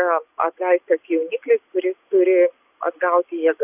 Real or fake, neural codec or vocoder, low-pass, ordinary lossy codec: real; none; 3.6 kHz; AAC, 32 kbps